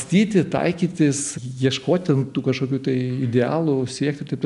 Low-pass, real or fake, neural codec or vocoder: 10.8 kHz; real; none